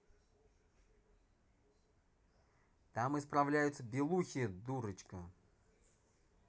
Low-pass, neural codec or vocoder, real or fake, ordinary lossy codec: none; none; real; none